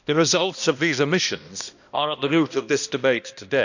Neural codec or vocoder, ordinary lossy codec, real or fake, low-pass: codec, 16 kHz, 1 kbps, X-Codec, HuBERT features, trained on balanced general audio; none; fake; 7.2 kHz